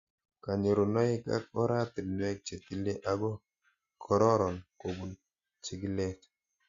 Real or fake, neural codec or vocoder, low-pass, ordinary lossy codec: real; none; 7.2 kHz; Opus, 64 kbps